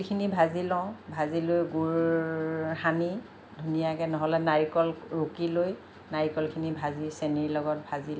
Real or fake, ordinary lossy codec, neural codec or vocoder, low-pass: real; none; none; none